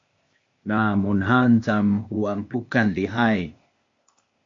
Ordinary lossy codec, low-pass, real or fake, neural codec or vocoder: MP3, 48 kbps; 7.2 kHz; fake; codec, 16 kHz, 0.8 kbps, ZipCodec